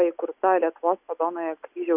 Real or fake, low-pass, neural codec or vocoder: real; 3.6 kHz; none